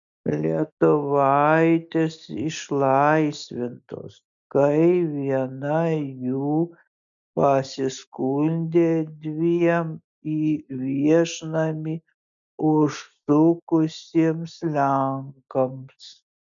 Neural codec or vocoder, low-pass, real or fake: none; 7.2 kHz; real